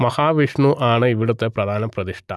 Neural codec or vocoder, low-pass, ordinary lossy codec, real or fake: none; none; none; real